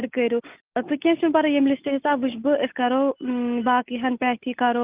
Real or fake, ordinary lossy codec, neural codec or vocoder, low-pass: real; Opus, 24 kbps; none; 3.6 kHz